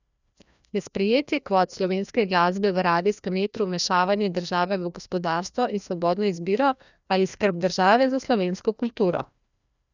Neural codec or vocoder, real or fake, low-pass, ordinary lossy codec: codec, 16 kHz, 1 kbps, FreqCodec, larger model; fake; 7.2 kHz; none